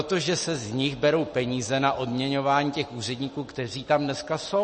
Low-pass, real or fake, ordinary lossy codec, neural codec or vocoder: 10.8 kHz; real; MP3, 32 kbps; none